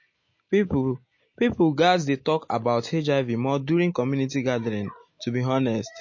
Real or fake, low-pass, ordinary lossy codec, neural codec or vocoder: real; 7.2 kHz; MP3, 32 kbps; none